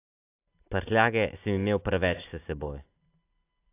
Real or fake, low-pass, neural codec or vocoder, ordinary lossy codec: real; 3.6 kHz; none; AAC, 24 kbps